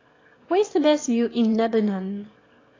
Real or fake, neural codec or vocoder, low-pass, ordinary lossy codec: fake; autoencoder, 22.05 kHz, a latent of 192 numbers a frame, VITS, trained on one speaker; 7.2 kHz; AAC, 32 kbps